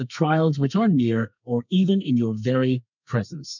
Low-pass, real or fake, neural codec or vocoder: 7.2 kHz; fake; codec, 32 kHz, 1.9 kbps, SNAC